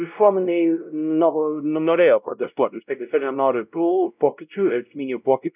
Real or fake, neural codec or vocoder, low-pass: fake; codec, 16 kHz, 0.5 kbps, X-Codec, WavLM features, trained on Multilingual LibriSpeech; 3.6 kHz